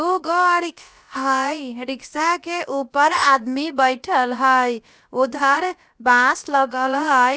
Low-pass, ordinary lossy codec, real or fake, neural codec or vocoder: none; none; fake; codec, 16 kHz, about 1 kbps, DyCAST, with the encoder's durations